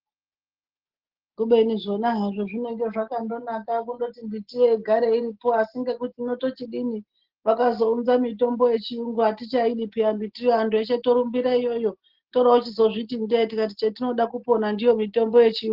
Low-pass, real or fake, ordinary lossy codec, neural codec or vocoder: 5.4 kHz; real; Opus, 16 kbps; none